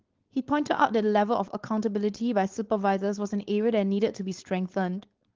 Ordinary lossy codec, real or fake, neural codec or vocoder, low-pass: Opus, 16 kbps; fake; codec, 16 kHz, 4.8 kbps, FACodec; 7.2 kHz